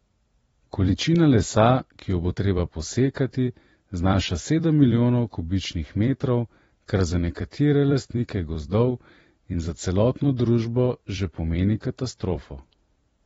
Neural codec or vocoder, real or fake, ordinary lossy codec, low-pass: vocoder, 44.1 kHz, 128 mel bands every 256 samples, BigVGAN v2; fake; AAC, 24 kbps; 19.8 kHz